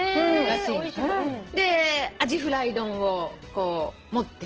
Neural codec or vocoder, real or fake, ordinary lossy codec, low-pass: none; real; Opus, 16 kbps; 7.2 kHz